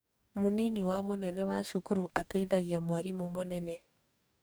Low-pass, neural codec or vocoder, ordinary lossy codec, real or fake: none; codec, 44.1 kHz, 2.6 kbps, DAC; none; fake